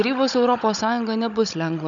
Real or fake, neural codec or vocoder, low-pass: fake; codec, 16 kHz, 16 kbps, FunCodec, trained on Chinese and English, 50 frames a second; 7.2 kHz